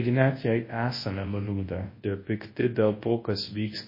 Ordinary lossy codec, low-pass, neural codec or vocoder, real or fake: MP3, 24 kbps; 5.4 kHz; codec, 24 kHz, 0.9 kbps, WavTokenizer, large speech release; fake